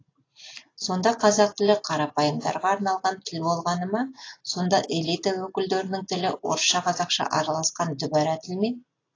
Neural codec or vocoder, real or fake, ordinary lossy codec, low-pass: none; real; AAC, 32 kbps; 7.2 kHz